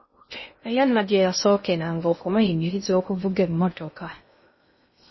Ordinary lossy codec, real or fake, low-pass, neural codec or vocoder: MP3, 24 kbps; fake; 7.2 kHz; codec, 16 kHz in and 24 kHz out, 0.6 kbps, FocalCodec, streaming, 2048 codes